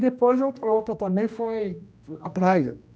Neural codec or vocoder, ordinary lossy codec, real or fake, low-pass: codec, 16 kHz, 1 kbps, X-Codec, HuBERT features, trained on general audio; none; fake; none